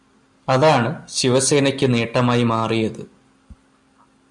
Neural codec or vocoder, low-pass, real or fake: none; 10.8 kHz; real